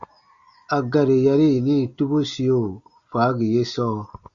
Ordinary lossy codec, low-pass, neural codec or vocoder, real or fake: Opus, 64 kbps; 7.2 kHz; none; real